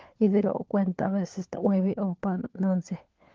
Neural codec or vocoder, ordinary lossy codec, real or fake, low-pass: codec, 16 kHz, 4 kbps, FunCodec, trained on LibriTTS, 50 frames a second; Opus, 16 kbps; fake; 7.2 kHz